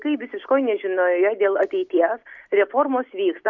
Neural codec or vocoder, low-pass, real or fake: none; 7.2 kHz; real